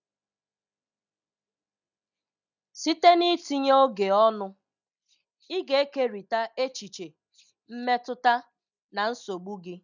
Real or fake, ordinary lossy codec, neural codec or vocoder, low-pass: real; none; none; 7.2 kHz